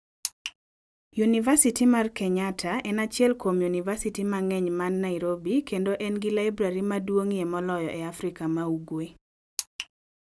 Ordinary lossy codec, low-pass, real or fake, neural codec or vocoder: none; none; real; none